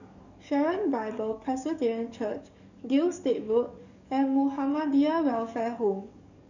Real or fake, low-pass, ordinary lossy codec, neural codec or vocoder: fake; 7.2 kHz; none; codec, 44.1 kHz, 7.8 kbps, DAC